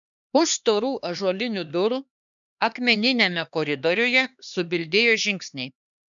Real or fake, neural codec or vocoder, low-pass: fake; codec, 16 kHz, 2 kbps, X-Codec, HuBERT features, trained on LibriSpeech; 7.2 kHz